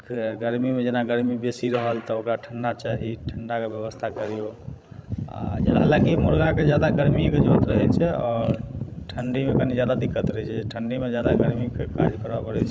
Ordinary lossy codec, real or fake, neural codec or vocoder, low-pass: none; fake; codec, 16 kHz, 16 kbps, FreqCodec, larger model; none